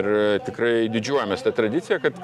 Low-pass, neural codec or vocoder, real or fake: 14.4 kHz; none; real